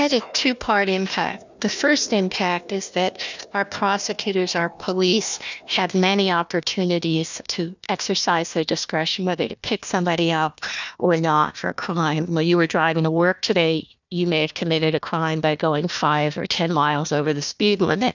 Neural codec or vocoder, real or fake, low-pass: codec, 16 kHz, 1 kbps, FunCodec, trained on Chinese and English, 50 frames a second; fake; 7.2 kHz